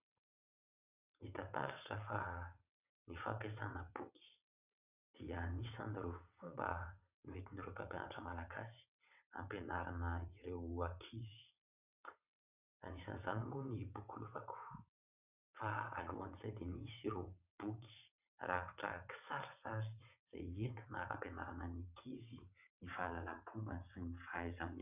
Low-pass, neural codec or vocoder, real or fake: 3.6 kHz; vocoder, 22.05 kHz, 80 mel bands, WaveNeXt; fake